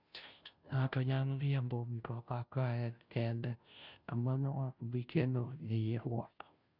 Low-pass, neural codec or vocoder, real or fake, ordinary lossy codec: 5.4 kHz; codec, 16 kHz, 0.5 kbps, FunCodec, trained on Chinese and English, 25 frames a second; fake; none